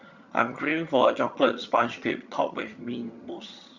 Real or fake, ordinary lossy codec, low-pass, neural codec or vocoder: fake; Opus, 64 kbps; 7.2 kHz; vocoder, 22.05 kHz, 80 mel bands, HiFi-GAN